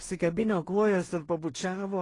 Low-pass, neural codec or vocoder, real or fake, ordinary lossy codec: 10.8 kHz; codec, 16 kHz in and 24 kHz out, 0.4 kbps, LongCat-Audio-Codec, two codebook decoder; fake; AAC, 32 kbps